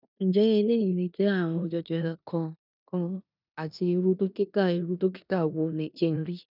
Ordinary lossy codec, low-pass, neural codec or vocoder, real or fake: none; 5.4 kHz; codec, 16 kHz in and 24 kHz out, 0.9 kbps, LongCat-Audio-Codec, four codebook decoder; fake